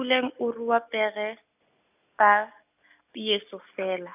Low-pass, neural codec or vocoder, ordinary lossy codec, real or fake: 3.6 kHz; none; none; real